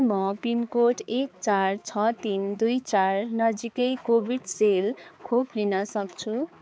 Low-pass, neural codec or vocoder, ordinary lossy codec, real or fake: none; codec, 16 kHz, 4 kbps, X-Codec, HuBERT features, trained on balanced general audio; none; fake